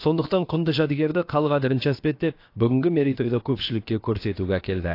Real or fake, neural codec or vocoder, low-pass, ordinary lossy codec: fake; codec, 16 kHz, about 1 kbps, DyCAST, with the encoder's durations; 5.4 kHz; AAC, 32 kbps